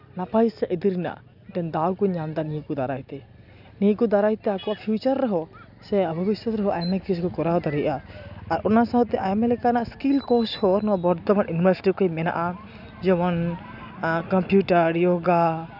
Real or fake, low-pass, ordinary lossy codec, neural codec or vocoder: real; 5.4 kHz; none; none